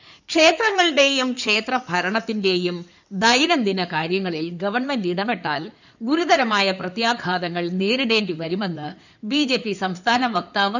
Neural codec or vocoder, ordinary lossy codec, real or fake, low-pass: codec, 16 kHz in and 24 kHz out, 2.2 kbps, FireRedTTS-2 codec; none; fake; 7.2 kHz